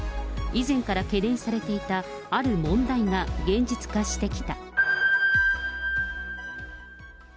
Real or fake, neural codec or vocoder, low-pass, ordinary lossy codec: real; none; none; none